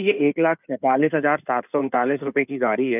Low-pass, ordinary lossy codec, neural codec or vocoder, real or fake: 3.6 kHz; none; codec, 16 kHz in and 24 kHz out, 2.2 kbps, FireRedTTS-2 codec; fake